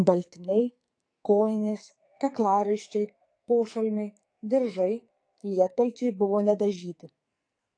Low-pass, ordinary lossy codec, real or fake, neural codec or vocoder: 9.9 kHz; AAC, 48 kbps; fake; codec, 44.1 kHz, 2.6 kbps, SNAC